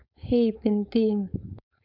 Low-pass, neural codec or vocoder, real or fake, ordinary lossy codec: 5.4 kHz; codec, 16 kHz, 4.8 kbps, FACodec; fake; none